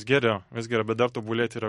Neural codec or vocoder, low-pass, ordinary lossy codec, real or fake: none; 19.8 kHz; MP3, 48 kbps; real